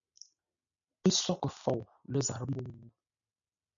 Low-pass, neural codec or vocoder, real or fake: 7.2 kHz; none; real